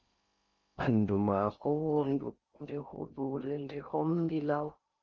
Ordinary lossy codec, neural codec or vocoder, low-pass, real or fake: Opus, 24 kbps; codec, 16 kHz in and 24 kHz out, 0.6 kbps, FocalCodec, streaming, 4096 codes; 7.2 kHz; fake